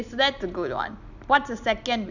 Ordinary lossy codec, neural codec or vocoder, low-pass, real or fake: none; none; 7.2 kHz; real